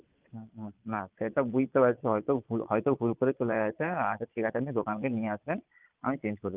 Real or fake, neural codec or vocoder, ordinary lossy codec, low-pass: fake; vocoder, 22.05 kHz, 80 mel bands, Vocos; Opus, 32 kbps; 3.6 kHz